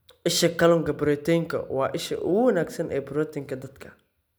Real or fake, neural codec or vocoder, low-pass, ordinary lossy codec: real; none; none; none